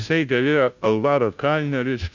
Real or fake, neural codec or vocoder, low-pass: fake; codec, 16 kHz, 0.5 kbps, FunCodec, trained on Chinese and English, 25 frames a second; 7.2 kHz